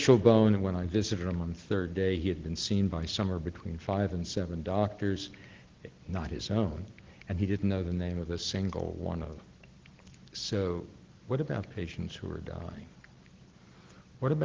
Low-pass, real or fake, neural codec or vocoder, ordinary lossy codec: 7.2 kHz; real; none; Opus, 16 kbps